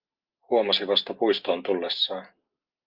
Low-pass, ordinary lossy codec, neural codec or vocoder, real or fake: 5.4 kHz; Opus, 24 kbps; none; real